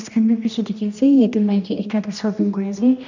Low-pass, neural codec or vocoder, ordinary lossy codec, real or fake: 7.2 kHz; codec, 16 kHz, 1 kbps, X-Codec, HuBERT features, trained on general audio; none; fake